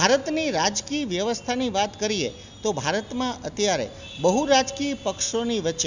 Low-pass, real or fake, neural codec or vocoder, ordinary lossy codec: 7.2 kHz; real; none; none